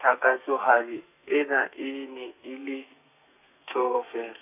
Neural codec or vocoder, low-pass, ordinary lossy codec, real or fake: codec, 32 kHz, 1.9 kbps, SNAC; 3.6 kHz; none; fake